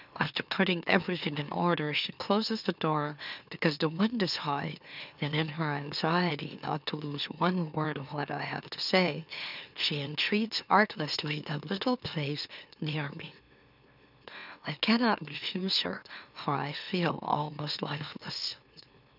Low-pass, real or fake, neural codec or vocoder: 5.4 kHz; fake; autoencoder, 44.1 kHz, a latent of 192 numbers a frame, MeloTTS